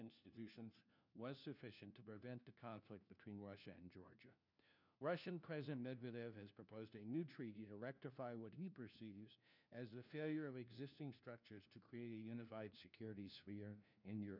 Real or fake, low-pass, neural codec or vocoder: fake; 5.4 kHz; codec, 16 kHz, 1 kbps, FunCodec, trained on LibriTTS, 50 frames a second